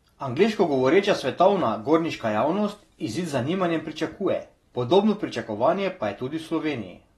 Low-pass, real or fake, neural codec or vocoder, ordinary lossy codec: 19.8 kHz; real; none; AAC, 32 kbps